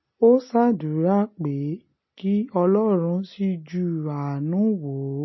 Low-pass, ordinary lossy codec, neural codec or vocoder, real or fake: 7.2 kHz; MP3, 24 kbps; none; real